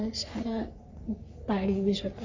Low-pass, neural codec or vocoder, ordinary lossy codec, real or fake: 7.2 kHz; codec, 44.1 kHz, 3.4 kbps, Pupu-Codec; MP3, 64 kbps; fake